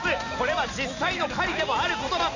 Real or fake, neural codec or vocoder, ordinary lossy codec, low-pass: real; none; none; 7.2 kHz